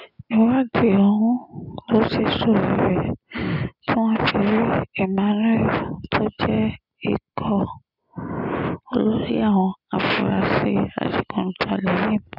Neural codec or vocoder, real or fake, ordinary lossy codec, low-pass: none; real; none; 5.4 kHz